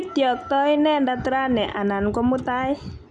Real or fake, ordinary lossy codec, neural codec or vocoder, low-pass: real; none; none; 10.8 kHz